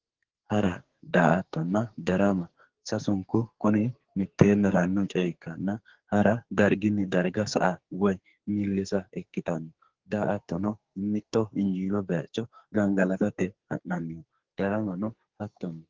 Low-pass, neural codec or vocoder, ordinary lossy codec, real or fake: 7.2 kHz; codec, 44.1 kHz, 2.6 kbps, SNAC; Opus, 16 kbps; fake